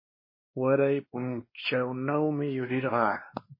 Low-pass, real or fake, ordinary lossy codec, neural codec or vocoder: 5.4 kHz; fake; MP3, 24 kbps; codec, 16 kHz, 2 kbps, X-Codec, WavLM features, trained on Multilingual LibriSpeech